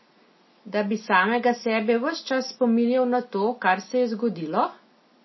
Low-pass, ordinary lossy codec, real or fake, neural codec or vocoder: 7.2 kHz; MP3, 24 kbps; real; none